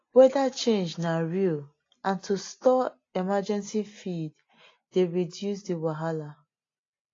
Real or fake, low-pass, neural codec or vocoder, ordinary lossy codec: real; 7.2 kHz; none; AAC, 32 kbps